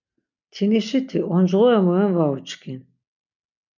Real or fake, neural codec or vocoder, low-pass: real; none; 7.2 kHz